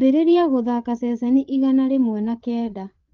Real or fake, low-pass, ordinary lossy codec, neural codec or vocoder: fake; 7.2 kHz; Opus, 32 kbps; codec, 16 kHz, 8 kbps, FreqCodec, larger model